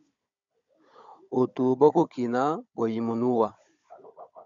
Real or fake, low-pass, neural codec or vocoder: fake; 7.2 kHz; codec, 16 kHz, 16 kbps, FunCodec, trained on Chinese and English, 50 frames a second